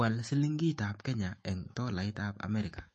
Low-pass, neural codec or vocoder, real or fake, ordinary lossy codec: 10.8 kHz; autoencoder, 48 kHz, 128 numbers a frame, DAC-VAE, trained on Japanese speech; fake; MP3, 32 kbps